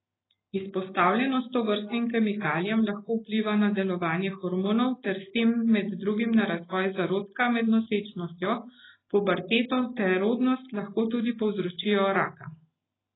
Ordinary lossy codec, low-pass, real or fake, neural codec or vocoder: AAC, 16 kbps; 7.2 kHz; real; none